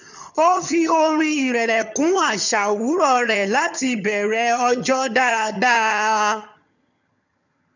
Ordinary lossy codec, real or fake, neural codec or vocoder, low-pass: none; fake; vocoder, 22.05 kHz, 80 mel bands, HiFi-GAN; 7.2 kHz